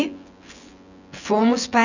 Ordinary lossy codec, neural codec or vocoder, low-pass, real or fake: none; vocoder, 24 kHz, 100 mel bands, Vocos; 7.2 kHz; fake